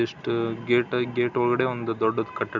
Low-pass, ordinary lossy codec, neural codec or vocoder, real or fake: 7.2 kHz; none; none; real